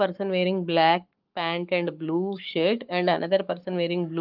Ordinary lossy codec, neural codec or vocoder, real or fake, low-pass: Opus, 32 kbps; codec, 44.1 kHz, 7.8 kbps, Pupu-Codec; fake; 5.4 kHz